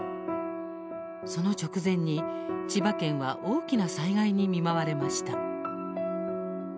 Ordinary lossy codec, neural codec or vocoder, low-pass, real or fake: none; none; none; real